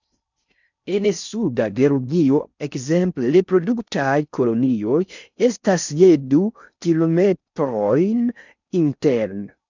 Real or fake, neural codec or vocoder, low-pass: fake; codec, 16 kHz in and 24 kHz out, 0.6 kbps, FocalCodec, streaming, 4096 codes; 7.2 kHz